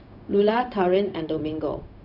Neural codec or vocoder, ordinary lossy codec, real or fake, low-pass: codec, 16 kHz, 0.4 kbps, LongCat-Audio-Codec; none; fake; 5.4 kHz